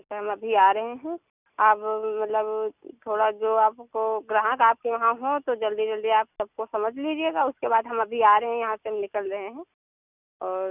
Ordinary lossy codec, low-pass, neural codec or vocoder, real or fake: none; 3.6 kHz; none; real